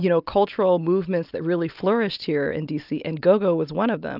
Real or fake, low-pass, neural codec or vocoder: real; 5.4 kHz; none